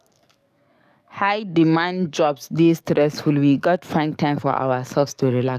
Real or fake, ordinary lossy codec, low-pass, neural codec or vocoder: fake; none; 14.4 kHz; codec, 44.1 kHz, 7.8 kbps, DAC